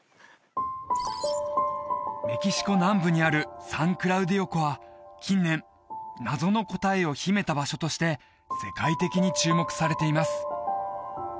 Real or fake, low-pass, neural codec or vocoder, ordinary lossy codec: real; none; none; none